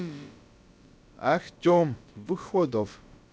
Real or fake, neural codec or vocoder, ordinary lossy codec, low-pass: fake; codec, 16 kHz, about 1 kbps, DyCAST, with the encoder's durations; none; none